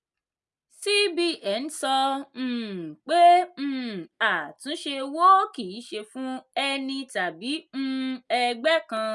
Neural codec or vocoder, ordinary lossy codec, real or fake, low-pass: none; none; real; none